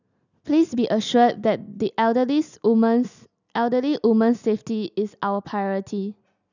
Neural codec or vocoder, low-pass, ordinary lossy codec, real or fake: none; 7.2 kHz; none; real